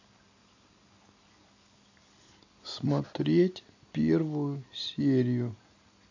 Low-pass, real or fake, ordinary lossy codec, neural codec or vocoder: 7.2 kHz; real; AAC, 48 kbps; none